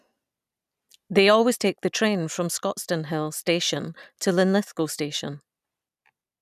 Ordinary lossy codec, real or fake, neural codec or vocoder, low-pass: none; real; none; 14.4 kHz